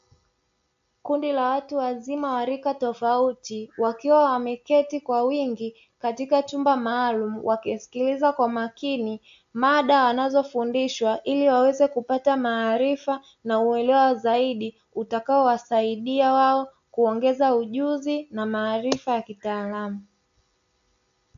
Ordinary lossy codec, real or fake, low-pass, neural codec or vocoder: AAC, 64 kbps; real; 7.2 kHz; none